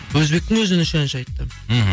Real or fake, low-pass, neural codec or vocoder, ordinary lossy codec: real; none; none; none